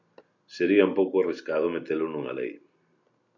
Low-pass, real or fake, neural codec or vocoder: 7.2 kHz; real; none